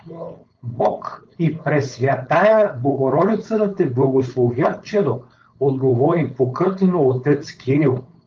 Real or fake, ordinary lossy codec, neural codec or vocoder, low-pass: fake; Opus, 24 kbps; codec, 16 kHz, 4.8 kbps, FACodec; 7.2 kHz